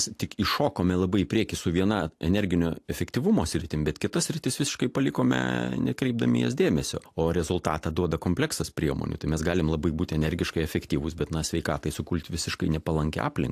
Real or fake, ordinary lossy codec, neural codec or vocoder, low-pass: real; AAC, 64 kbps; none; 14.4 kHz